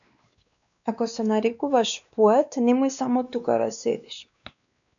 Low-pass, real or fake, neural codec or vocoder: 7.2 kHz; fake; codec, 16 kHz, 2 kbps, X-Codec, WavLM features, trained on Multilingual LibriSpeech